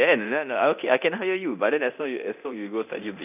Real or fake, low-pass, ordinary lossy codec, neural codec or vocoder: fake; 3.6 kHz; none; codec, 24 kHz, 0.9 kbps, DualCodec